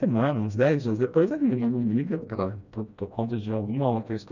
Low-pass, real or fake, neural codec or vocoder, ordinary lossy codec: 7.2 kHz; fake; codec, 16 kHz, 1 kbps, FreqCodec, smaller model; none